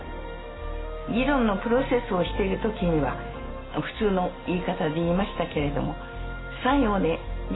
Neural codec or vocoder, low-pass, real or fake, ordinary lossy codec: none; 7.2 kHz; real; AAC, 16 kbps